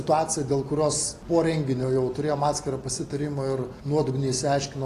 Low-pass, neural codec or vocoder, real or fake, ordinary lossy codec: 14.4 kHz; none; real; AAC, 48 kbps